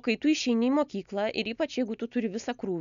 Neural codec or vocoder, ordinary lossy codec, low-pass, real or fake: none; MP3, 96 kbps; 7.2 kHz; real